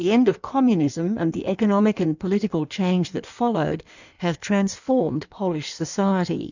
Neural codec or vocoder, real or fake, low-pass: codec, 16 kHz in and 24 kHz out, 1.1 kbps, FireRedTTS-2 codec; fake; 7.2 kHz